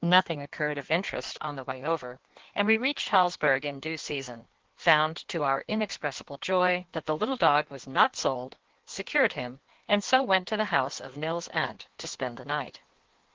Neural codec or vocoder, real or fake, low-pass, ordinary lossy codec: codec, 16 kHz in and 24 kHz out, 1.1 kbps, FireRedTTS-2 codec; fake; 7.2 kHz; Opus, 16 kbps